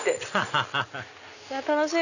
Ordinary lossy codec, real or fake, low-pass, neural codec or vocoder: none; real; 7.2 kHz; none